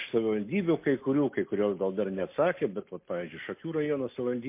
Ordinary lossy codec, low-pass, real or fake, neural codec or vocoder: MP3, 24 kbps; 3.6 kHz; real; none